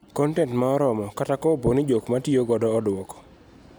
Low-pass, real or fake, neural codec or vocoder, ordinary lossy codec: none; real; none; none